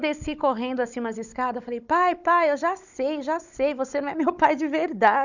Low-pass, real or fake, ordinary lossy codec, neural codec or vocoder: 7.2 kHz; fake; none; codec, 16 kHz, 16 kbps, FunCodec, trained on Chinese and English, 50 frames a second